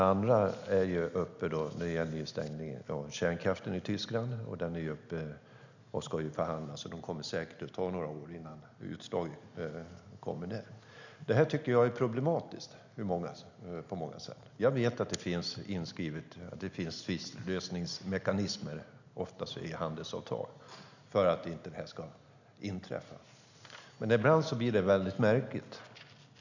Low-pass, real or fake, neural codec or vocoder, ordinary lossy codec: 7.2 kHz; real; none; none